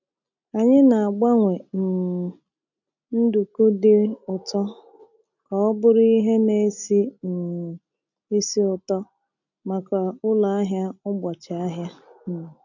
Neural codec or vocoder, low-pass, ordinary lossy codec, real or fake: none; 7.2 kHz; none; real